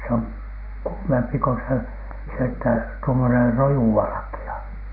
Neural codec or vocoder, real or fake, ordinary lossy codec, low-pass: none; real; none; 5.4 kHz